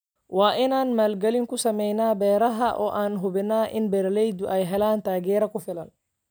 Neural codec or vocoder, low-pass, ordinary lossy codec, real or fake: none; none; none; real